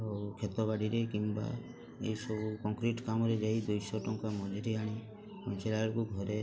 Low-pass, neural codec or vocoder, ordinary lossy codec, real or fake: none; none; none; real